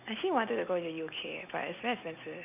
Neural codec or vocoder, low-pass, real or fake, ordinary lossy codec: none; 3.6 kHz; real; none